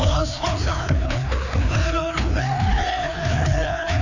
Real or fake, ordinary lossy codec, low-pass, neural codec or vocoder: fake; none; 7.2 kHz; codec, 16 kHz, 2 kbps, FreqCodec, larger model